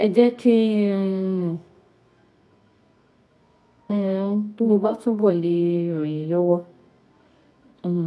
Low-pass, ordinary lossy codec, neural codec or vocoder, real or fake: none; none; codec, 24 kHz, 0.9 kbps, WavTokenizer, medium music audio release; fake